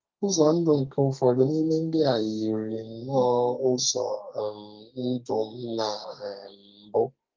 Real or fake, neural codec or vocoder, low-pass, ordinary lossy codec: fake; codec, 32 kHz, 1.9 kbps, SNAC; 7.2 kHz; Opus, 32 kbps